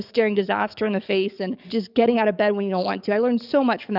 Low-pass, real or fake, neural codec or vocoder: 5.4 kHz; fake; codec, 44.1 kHz, 7.8 kbps, DAC